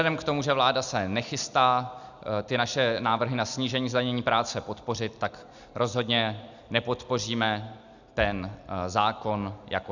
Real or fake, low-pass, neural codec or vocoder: real; 7.2 kHz; none